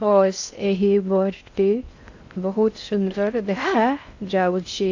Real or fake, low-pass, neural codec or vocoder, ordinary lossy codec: fake; 7.2 kHz; codec, 16 kHz in and 24 kHz out, 0.6 kbps, FocalCodec, streaming, 4096 codes; AAC, 48 kbps